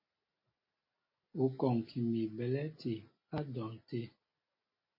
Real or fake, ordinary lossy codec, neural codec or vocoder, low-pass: real; MP3, 24 kbps; none; 5.4 kHz